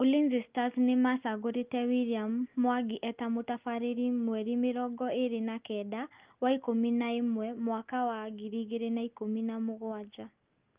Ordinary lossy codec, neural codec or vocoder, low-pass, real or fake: Opus, 32 kbps; none; 3.6 kHz; real